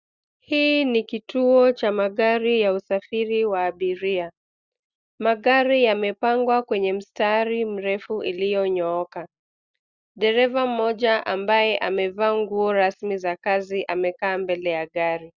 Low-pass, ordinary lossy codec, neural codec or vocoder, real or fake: 7.2 kHz; Opus, 64 kbps; none; real